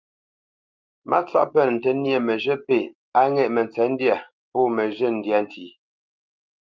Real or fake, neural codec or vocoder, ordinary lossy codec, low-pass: real; none; Opus, 24 kbps; 7.2 kHz